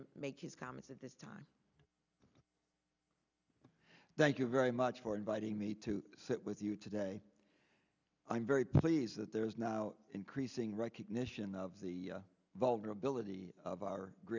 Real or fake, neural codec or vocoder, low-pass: real; none; 7.2 kHz